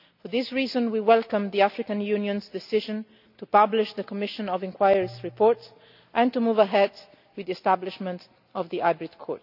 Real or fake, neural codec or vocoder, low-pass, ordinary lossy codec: real; none; 5.4 kHz; none